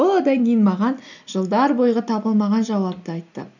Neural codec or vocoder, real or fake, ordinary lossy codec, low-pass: none; real; none; 7.2 kHz